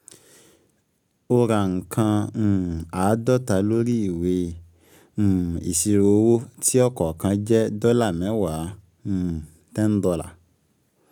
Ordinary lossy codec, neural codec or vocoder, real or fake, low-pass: none; none; real; 19.8 kHz